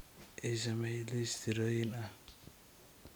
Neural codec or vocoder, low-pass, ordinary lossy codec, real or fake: none; none; none; real